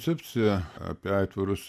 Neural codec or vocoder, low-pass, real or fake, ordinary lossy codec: none; 14.4 kHz; real; AAC, 96 kbps